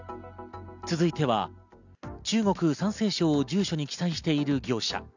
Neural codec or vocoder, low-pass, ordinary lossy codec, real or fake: none; 7.2 kHz; none; real